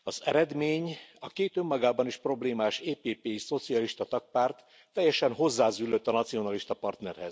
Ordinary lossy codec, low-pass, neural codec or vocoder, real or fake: none; none; none; real